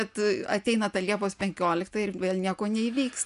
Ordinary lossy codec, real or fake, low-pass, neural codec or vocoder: MP3, 96 kbps; real; 10.8 kHz; none